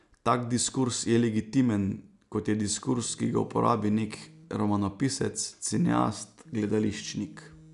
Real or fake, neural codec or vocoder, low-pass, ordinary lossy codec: real; none; 10.8 kHz; none